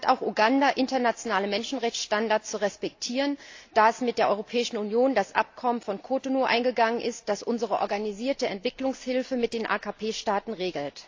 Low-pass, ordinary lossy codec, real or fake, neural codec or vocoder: 7.2 kHz; AAC, 48 kbps; real; none